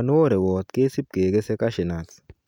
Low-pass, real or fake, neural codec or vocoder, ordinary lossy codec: 19.8 kHz; real; none; none